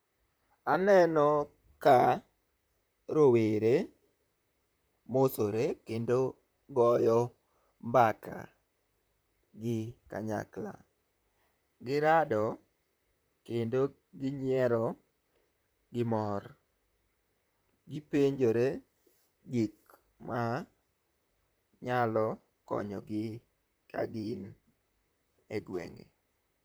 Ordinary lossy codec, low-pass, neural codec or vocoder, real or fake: none; none; vocoder, 44.1 kHz, 128 mel bands, Pupu-Vocoder; fake